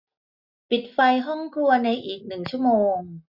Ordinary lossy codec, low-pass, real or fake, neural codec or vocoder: none; 5.4 kHz; real; none